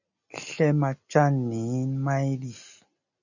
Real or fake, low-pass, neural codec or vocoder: real; 7.2 kHz; none